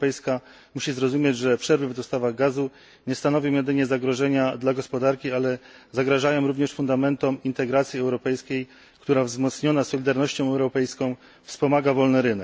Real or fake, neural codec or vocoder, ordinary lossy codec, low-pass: real; none; none; none